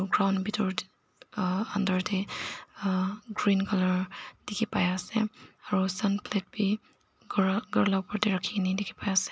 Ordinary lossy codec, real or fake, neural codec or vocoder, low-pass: none; real; none; none